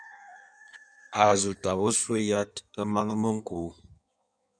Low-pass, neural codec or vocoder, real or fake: 9.9 kHz; codec, 16 kHz in and 24 kHz out, 1.1 kbps, FireRedTTS-2 codec; fake